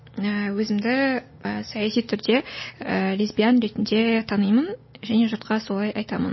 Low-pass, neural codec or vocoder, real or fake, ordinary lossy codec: 7.2 kHz; none; real; MP3, 24 kbps